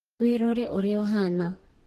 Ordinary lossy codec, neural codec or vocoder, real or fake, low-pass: Opus, 16 kbps; codec, 44.1 kHz, 2.6 kbps, DAC; fake; 14.4 kHz